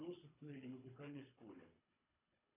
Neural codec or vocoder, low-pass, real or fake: codec, 24 kHz, 3 kbps, HILCodec; 3.6 kHz; fake